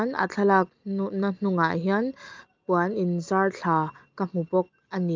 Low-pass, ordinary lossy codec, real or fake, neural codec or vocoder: 7.2 kHz; Opus, 24 kbps; real; none